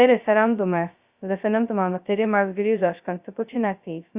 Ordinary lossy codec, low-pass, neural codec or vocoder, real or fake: Opus, 64 kbps; 3.6 kHz; codec, 16 kHz, 0.2 kbps, FocalCodec; fake